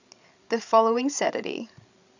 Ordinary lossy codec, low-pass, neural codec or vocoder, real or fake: none; 7.2 kHz; codec, 16 kHz, 16 kbps, FreqCodec, larger model; fake